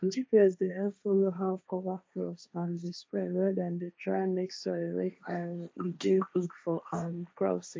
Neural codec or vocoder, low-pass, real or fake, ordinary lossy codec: codec, 16 kHz, 1.1 kbps, Voila-Tokenizer; none; fake; none